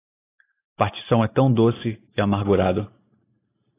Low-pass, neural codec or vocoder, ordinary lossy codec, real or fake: 3.6 kHz; none; AAC, 16 kbps; real